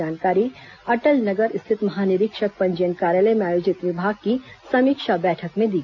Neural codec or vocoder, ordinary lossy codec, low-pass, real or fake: none; none; 7.2 kHz; real